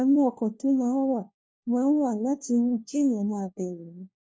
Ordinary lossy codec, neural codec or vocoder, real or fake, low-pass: none; codec, 16 kHz, 1 kbps, FunCodec, trained on LibriTTS, 50 frames a second; fake; none